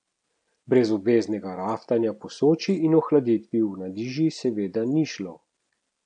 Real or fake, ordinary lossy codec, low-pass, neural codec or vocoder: real; none; 9.9 kHz; none